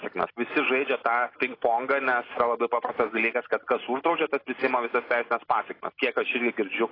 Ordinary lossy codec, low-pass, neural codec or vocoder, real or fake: AAC, 24 kbps; 5.4 kHz; none; real